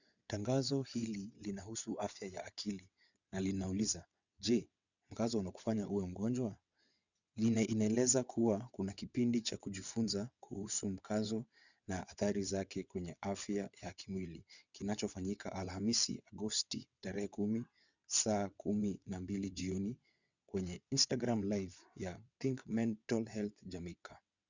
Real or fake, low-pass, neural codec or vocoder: fake; 7.2 kHz; vocoder, 22.05 kHz, 80 mel bands, WaveNeXt